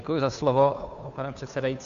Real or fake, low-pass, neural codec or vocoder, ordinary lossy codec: fake; 7.2 kHz; codec, 16 kHz, 2 kbps, FunCodec, trained on Chinese and English, 25 frames a second; AAC, 96 kbps